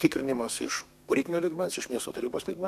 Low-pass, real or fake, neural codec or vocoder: 14.4 kHz; fake; codec, 32 kHz, 1.9 kbps, SNAC